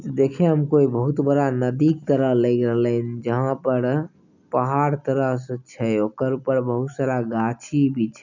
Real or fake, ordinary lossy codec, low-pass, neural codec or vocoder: real; none; 7.2 kHz; none